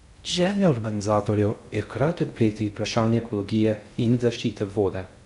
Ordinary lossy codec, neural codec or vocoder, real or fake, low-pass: none; codec, 16 kHz in and 24 kHz out, 0.6 kbps, FocalCodec, streaming, 2048 codes; fake; 10.8 kHz